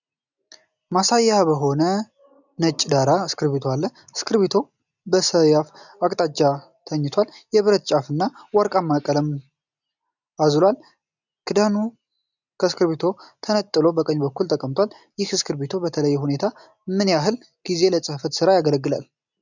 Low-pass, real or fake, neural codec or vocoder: 7.2 kHz; real; none